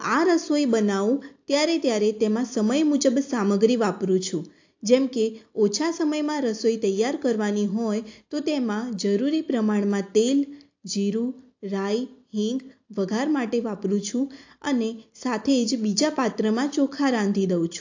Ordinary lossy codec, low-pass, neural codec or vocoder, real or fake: AAC, 48 kbps; 7.2 kHz; none; real